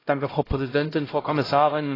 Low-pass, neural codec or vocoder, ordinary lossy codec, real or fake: 5.4 kHz; codec, 16 kHz, 0.5 kbps, X-Codec, HuBERT features, trained on LibriSpeech; AAC, 24 kbps; fake